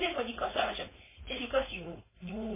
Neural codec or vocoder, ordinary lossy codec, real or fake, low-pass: codec, 16 kHz, 4.8 kbps, FACodec; MP3, 16 kbps; fake; 3.6 kHz